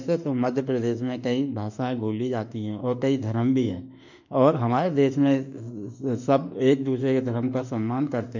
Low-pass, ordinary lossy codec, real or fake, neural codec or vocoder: 7.2 kHz; none; fake; autoencoder, 48 kHz, 32 numbers a frame, DAC-VAE, trained on Japanese speech